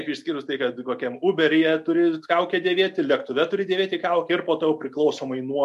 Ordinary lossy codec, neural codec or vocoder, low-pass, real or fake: MP3, 64 kbps; none; 14.4 kHz; real